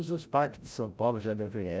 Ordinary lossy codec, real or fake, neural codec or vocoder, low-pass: none; fake; codec, 16 kHz, 0.5 kbps, FreqCodec, larger model; none